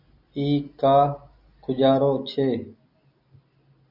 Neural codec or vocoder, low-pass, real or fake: none; 5.4 kHz; real